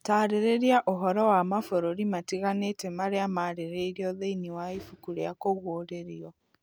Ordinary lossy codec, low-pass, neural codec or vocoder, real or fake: none; none; none; real